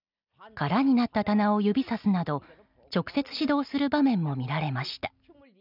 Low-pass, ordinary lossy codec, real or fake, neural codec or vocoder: 5.4 kHz; none; real; none